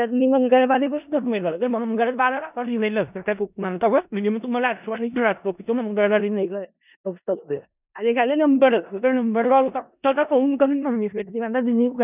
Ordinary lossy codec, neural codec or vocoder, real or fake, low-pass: none; codec, 16 kHz in and 24 kHz out, 0.4 kbps, LongCat-Audio-Codec, four codebook decoder; fake; 3.6 kHz